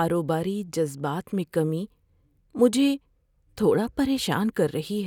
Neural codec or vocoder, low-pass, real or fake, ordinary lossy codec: none; 19.8 kHz; real; none